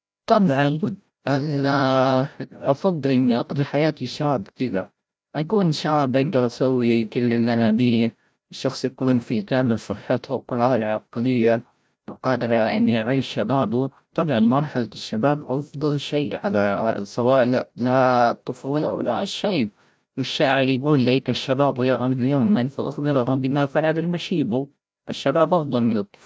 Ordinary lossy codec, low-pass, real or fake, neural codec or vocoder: none; none; fake; codec, 16 kHz, 0.5 kbps, FreqCodec, larger model